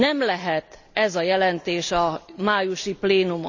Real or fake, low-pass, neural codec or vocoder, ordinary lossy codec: real; 7.2 kHz; none; none